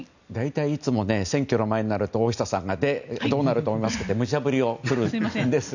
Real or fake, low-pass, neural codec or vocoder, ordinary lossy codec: real; 7.2 kHz; none; none